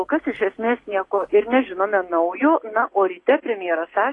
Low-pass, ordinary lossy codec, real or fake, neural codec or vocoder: 10.8 kHz; AAC, 32 kbps; real; none